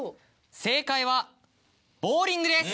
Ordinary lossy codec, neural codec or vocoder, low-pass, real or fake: none; none; none; real